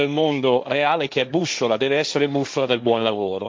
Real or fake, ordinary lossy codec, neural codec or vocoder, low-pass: fake; none; codec, 16 kHz, 1.1 kbps, Voila-Tokenizer; none